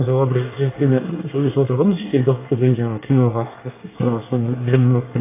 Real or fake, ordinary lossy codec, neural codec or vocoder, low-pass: fake; AAC, 24 kbps; codec, 24 kHz, 1 kbps, SNAC; 3.6 kHz